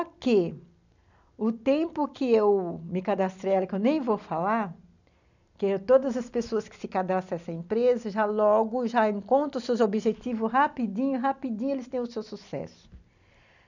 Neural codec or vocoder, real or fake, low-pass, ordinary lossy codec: none; real; 7.2 kHz; none